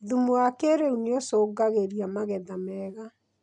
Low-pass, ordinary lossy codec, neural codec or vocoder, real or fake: 10.8 kHz; MP3, 64 kbps; none; real